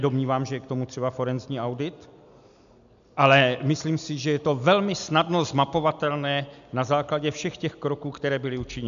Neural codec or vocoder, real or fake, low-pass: none; real; 7.2 kHz